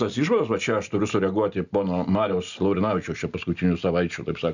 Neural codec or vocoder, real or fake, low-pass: none; real; 7.2 kHz